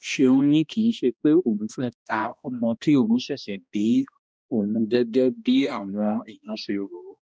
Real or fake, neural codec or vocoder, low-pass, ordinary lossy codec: fake; codec, 16 kHz, 1 kbps, X-Codec, HuBERT features, trained on balanced general audio; none; none